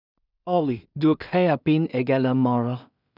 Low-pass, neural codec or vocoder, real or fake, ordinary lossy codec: 5.4 kHz; codec, 16 kHz in and 24 kHz out, 0.4 kbps, LongCat-Audio-Codec, two codebook decoder; fake; none